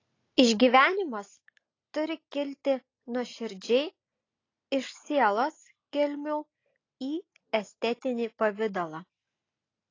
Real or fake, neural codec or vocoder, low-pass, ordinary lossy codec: real; none; 7.2 kHz; AAC, 32 kbps